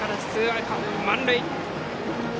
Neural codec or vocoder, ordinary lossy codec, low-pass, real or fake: none; none; none; real